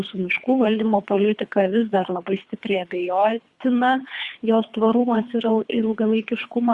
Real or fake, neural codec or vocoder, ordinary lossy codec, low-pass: fake; codec, 24 kHz, 3 kbps, HILCodec; Opus, 64 kbps; 10.8 kHz